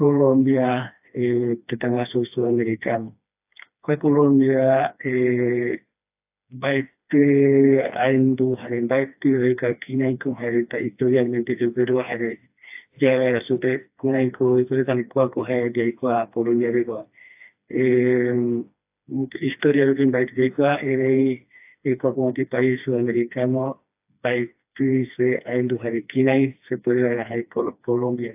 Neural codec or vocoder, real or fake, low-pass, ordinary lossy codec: codec, 16 kHz, 2 kbps, FreqCodec, smaller model; fake; 3.6 kHz; AAC, 32 kbps